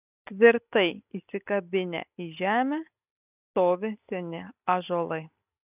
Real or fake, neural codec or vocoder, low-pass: real; none; 3.6 kHz